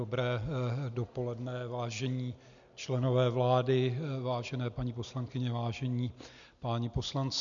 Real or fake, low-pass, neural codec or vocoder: real; 7.2 kHz; none